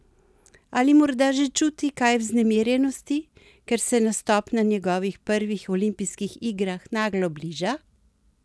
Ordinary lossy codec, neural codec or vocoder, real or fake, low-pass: none; none; real; none